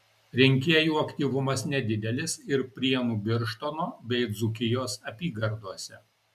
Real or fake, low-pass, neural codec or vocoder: real; 14.4 kHz; none